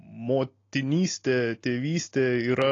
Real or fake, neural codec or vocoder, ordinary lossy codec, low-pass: real; none; AAC, 32 kbps; 7.2 kHz